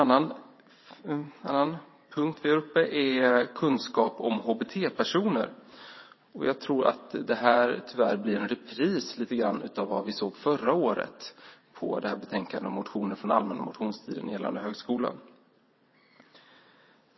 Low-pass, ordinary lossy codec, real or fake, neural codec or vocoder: 7.2 kHz; MP3, 24 kbps; fake; vocoder, 22.05 kHz, 80 mel bands, WaveNeXt